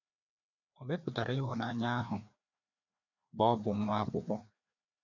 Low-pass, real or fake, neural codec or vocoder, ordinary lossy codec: 7.2 kHz; fake; codec, 16 kHz, 2 kbps, FreqCodec, larger model; none